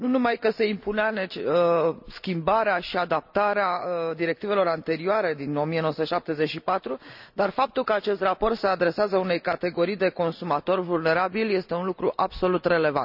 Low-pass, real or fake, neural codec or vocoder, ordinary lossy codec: 5.4 kHz; real; none; none